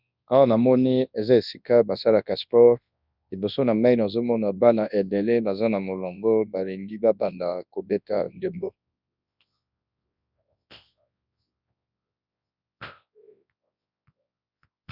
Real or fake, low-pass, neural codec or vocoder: fake; 5.4 kHz; codec, 24 kHz, 0.9 kbps, WavTokenizer, large speech release